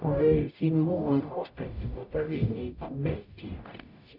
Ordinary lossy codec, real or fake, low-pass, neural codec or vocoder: none; fake; 5.4 kHz; codec, 44.1 kHz, 0.9 kbps, DAC